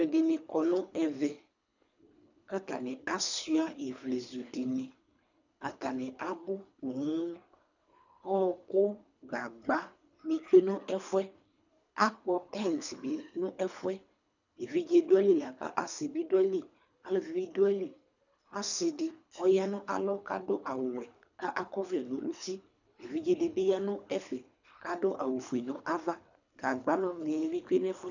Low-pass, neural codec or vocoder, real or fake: 7.2 kHz; codec, 24 kHz, 3 kbps, HILCodec; fake